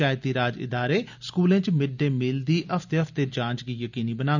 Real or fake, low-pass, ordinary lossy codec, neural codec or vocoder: real; 7.2 kHz; none; none